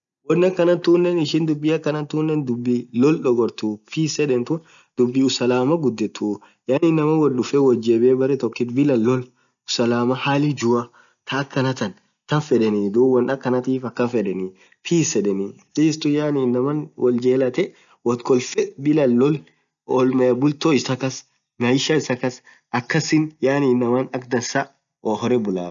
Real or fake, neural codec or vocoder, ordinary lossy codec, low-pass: real; none; none; 7.2 kHz